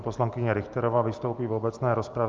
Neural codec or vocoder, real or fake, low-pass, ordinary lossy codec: none; real; 7.2 kHz; Opus, 24 kbps